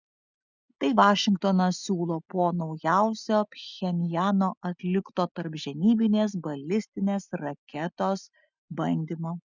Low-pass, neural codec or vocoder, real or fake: 7.2 kHz; none; real